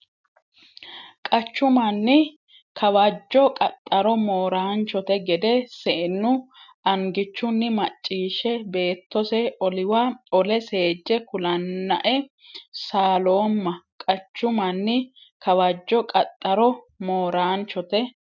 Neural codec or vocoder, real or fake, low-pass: none; real; 7.2 kHz